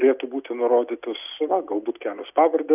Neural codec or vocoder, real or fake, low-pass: none; real; 3.6 kHz